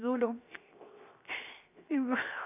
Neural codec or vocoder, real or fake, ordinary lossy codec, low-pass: codec, 16 kHz, 0.7 kbps, FocalCodec; fake; none; 3.6 kHz